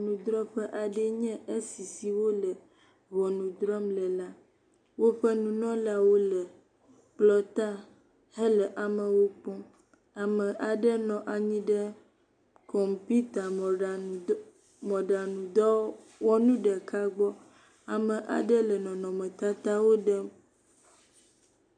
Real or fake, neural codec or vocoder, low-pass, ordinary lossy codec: real; none; 9.9 kHz; MP3, 96 kbps